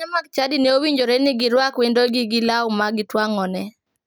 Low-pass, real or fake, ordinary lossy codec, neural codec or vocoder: none; real; none; none